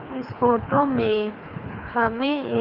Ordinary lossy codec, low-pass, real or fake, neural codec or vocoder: none; 5.4 kHz; fake; codec, 24 kHz, 3 kbps, HILCodec